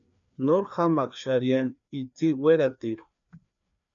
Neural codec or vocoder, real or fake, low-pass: codec, 16 kHz, 2 kbps, FreqCodec, larger model; fake; 7.2 kHz